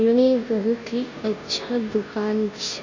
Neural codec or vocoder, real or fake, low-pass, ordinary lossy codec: codec, 16 kHz, 0.5 kbps, FunCodec, trained on Chinese and English, 25 frames a second; fake; 7.2 kHz; Opus, 64 kbps